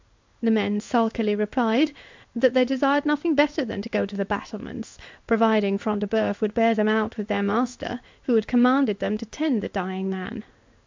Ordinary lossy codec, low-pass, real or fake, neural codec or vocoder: MP3, 64 kbps; 7.2 kHz; fake; codec, 16 kHz in and 24 kHz out, 1 kbps, XY-Tokenizer